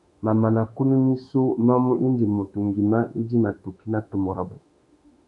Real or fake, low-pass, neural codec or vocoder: fake; 10.8 kHz; autoencoder, 48 kHz, 32 numbers a frame, DAC-VAE, trained on Japanese speech